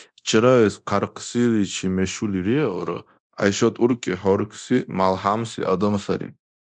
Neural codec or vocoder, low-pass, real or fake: codec, 24 kHz, 0.9 kbps, DualCodec; 9.9 kHz; fake